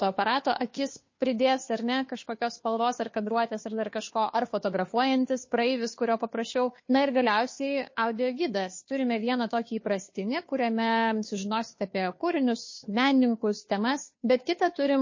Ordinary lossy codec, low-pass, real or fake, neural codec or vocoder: MP3, 32 kbps; 7.2 kHz; fake; codec, 16 kHz, 2 kbps, FunCodec, trained on Chinese and English, 25 frames a second